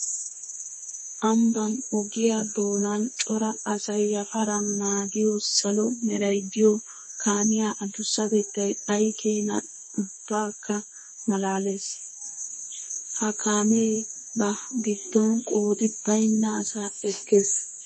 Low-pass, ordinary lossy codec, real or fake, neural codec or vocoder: 9.9 kHz; MP3, 32 kbps; fake; codec, 32 kHz, 1.9 kbps, SNAC